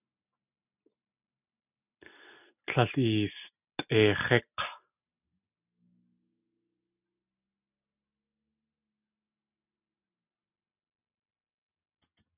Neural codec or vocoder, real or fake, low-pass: none; real; 3.6 kHz